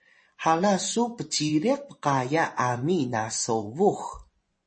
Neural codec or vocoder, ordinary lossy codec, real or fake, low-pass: vocoder, 24 kHz, 100 mel bands, Vocos; MP3, 32 kbps; fake; 9.9 kHz